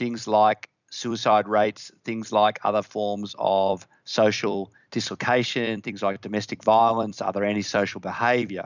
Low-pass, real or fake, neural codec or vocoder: 7.2 kHz; fake; vocoder, 44.1 kHz, 128 mel bands every 256 samples, BigVGAN v2